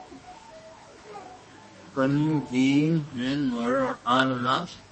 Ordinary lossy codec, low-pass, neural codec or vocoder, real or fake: MP3, 32 kbps; 10.8 kHz; codec, 24 kHz, 0.9 kbps, WavTokenizer, medium music audio release; fake